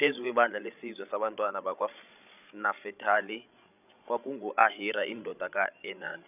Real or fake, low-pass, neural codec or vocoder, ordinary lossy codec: fake; 3.6 kHz; codec, 16 kHz, 16 kbps, FreqCodec, larger model; none